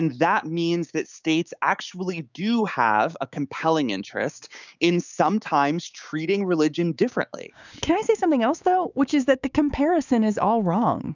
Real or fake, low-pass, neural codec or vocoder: fake; 7.2 kHz; codec, 16 kHz, 6 kbps, DAC